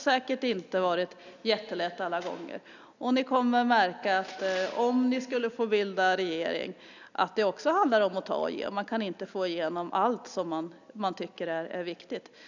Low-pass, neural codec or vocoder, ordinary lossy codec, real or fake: 7.2 kHz; none; none; real